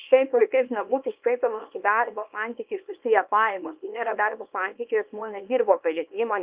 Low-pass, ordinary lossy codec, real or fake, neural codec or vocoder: 3.6 kHz; Opus, 24 kbps; fake; codec, 24 kHz, 0.9 kbps, WavTokenizer, small release